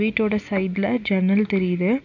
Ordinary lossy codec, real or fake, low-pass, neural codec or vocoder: none; real; 7.2 kHz; none